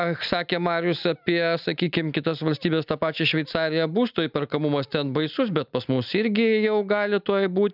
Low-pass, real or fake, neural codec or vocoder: 5.4 kHz; real; none